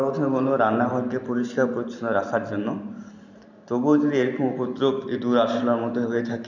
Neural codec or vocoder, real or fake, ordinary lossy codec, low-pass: vocoder, 44.1 kHz, 128 mel bands every 512 samples, BigVGAN v2; fake; none; 7.2 kHz